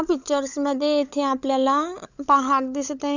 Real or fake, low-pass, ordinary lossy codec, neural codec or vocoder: fake; 7.2 kHz; none; codec, 16 kHz, 16 kbps, FunCodec, trained on LibriTTS, 50 frames a second